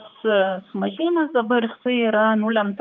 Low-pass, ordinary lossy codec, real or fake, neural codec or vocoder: 7.2 kHz; Opus, 24 kbps; fake; codec, 16 kHz, 4 kbps, X-Codec, HuBERT features, trained on general audio